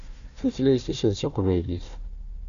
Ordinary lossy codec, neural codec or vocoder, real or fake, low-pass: MP3, 96 kbps; codec, 16 kHz, 1 kbps, FunCodec, trained on Chinese and English, 50 frames a second; fake; 7.2 kHz